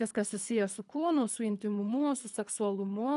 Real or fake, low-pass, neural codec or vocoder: fake; 10.8 kHz; codec, 24 kHz, 3 kbps, HILCodec